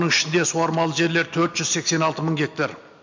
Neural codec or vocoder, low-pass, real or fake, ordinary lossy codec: none; 7.2 kHz; real; MP3, 48 kbps